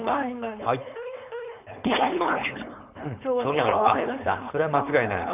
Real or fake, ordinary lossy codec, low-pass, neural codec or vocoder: fake; none; 3.6 kHz; codec, 16 kHz, 4.8 kbps, FACodec